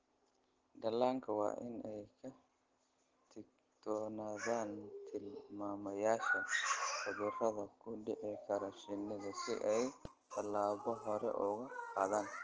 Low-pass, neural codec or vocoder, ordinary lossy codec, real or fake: 7.2 kHz; none; Opus, 16 kbps; real